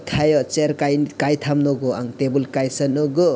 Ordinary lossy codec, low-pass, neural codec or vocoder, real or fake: none; none; none; real